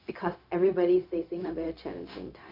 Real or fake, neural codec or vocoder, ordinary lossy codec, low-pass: fake; codec, 16 kHz, 0.4 kbps, LongCat-Audio-Codec; MP3, 48 kbps; 5.4 kHz